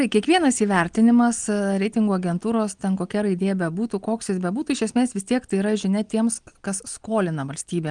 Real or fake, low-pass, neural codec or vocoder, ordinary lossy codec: real; 9.9 kHz; none; Opus, 24 kbps